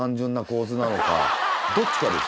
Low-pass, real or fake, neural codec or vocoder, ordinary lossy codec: none; real; none; none